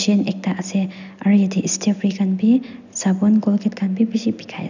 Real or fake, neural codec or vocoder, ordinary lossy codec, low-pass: real; none; none; 7.2 kHz